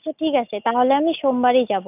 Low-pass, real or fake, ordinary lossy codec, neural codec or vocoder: 3.6 kHz; real; none; none